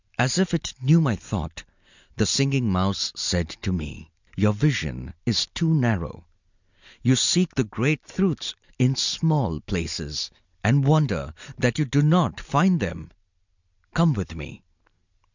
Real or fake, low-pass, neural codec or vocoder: real; 7.2 kHz; none